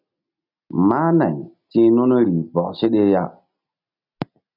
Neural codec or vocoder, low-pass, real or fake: none; 5.4 kHz; real